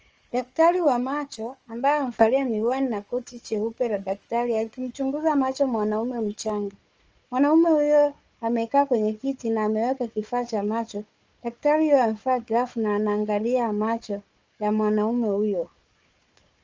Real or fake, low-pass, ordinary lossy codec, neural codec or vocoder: fake; 7.2 kHz; Opus, 24 kbps; codec, 16 kHz, 4 kbps, FunCodec, trained on Chinese and English, 50 frames a second